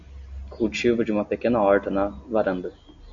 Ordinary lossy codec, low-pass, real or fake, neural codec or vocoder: MP3, 48 kbps; 7.2 kHz; real; none